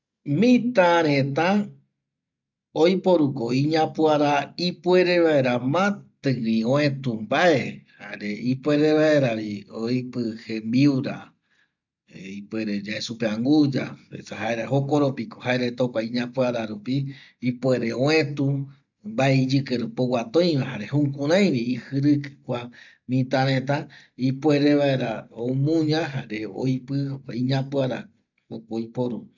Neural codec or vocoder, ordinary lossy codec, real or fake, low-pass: none; none; real; 7.2 kHz